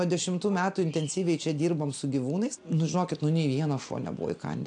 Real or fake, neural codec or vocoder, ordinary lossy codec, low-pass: real; none; AAC, 64 kbps; 9.9 kHz